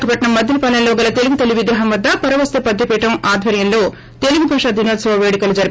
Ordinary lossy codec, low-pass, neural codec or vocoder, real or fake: none; none; none; real